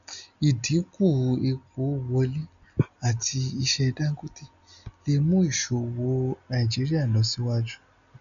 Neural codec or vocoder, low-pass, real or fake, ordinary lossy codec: none; 7.2 kHz; real; none